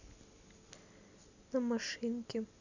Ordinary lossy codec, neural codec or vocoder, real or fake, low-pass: none; none; real; 7.2 kHz